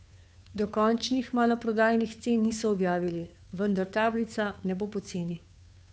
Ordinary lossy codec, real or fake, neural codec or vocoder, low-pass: none; fake; codec, 16 kHz, 2 kbps, FunCodec, trained on Chinese and English, 25 frames a second; none